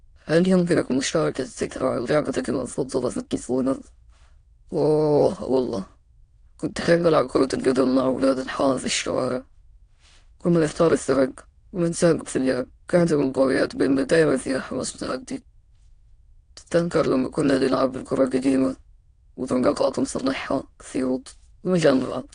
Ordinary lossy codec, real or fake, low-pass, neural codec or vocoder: AAC, 48 kbps; fake; 9.9 kHz; autoencoder, 22.05 kHz, a latent of 192 numbers a frame, VITS, trained on many speakers